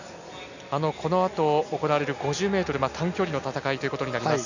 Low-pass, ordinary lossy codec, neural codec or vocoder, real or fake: 7.2 kHz; none; none; real